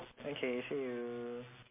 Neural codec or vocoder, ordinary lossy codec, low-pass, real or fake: none; MP3, 24 kbps; 3.6 kHz; real